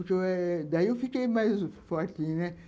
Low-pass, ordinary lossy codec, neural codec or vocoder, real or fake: none; none; none; real